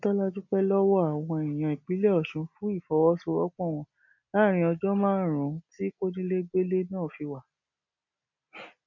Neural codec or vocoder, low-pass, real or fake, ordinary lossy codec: none; 7.2 kHz; real; none